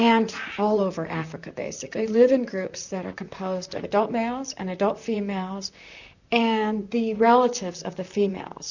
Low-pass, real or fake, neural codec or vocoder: 7.2 kHz; fake; vocoder, 44.1 kHz, 128 mel bands, Pupu-Vocoder